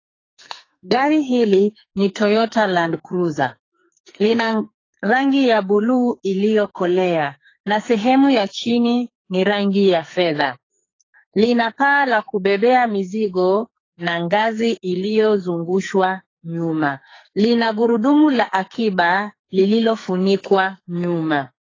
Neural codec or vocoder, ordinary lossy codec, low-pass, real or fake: codec, 44.1 kHz, 2.6 kbps, SNAC; AAC, 32 kbps; 7.2 kHz; fake